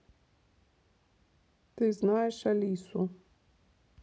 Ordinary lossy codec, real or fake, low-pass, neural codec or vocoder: none; real; none; none